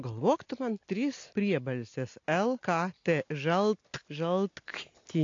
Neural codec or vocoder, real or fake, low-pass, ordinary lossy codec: none; real; 7.2 kHz; AAC, 48 kbps